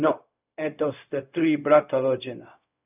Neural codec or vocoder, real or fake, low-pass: codec, 16 kHz, 0.4 kbps, LongCat-Audio-Codec; fake; 3.6 kHz